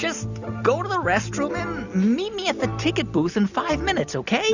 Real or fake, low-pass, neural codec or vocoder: real; 7.2 kHz; none